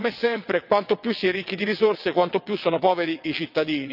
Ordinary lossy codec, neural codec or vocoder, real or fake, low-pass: none; vocoder, 22.05 kHz, 80 mel bands, WaveNeXt; fake; 5.4 kHz